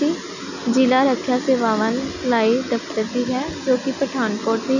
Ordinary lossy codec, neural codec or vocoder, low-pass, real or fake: none; none; 7.2 kHz; real